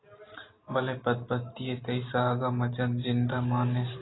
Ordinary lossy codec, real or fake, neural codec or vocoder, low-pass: AAC, 16 kbps; real; none; 7.2 kHz